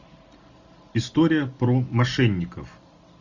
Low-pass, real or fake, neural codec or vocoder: 7.2 kHz; real; none